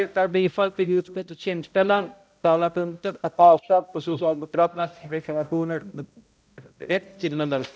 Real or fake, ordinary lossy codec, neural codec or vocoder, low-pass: fake; none; codec, 16 kHz, 0.5 kbps, X-Codec, HuBERT features, trained on balanced general audio; none